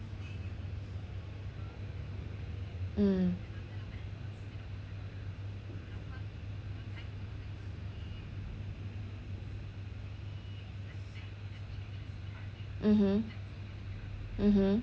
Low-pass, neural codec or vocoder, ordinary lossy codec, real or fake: none; none; none; real